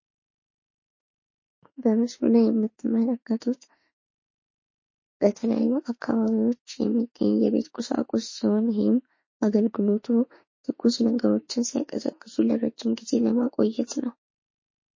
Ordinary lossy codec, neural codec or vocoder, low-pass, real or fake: MP3, 32 kbps; autoencoder, 48 kHz, 32 numbers a frame, DAC-VAE, trained on Japanese speech; 7.2 kHz; fake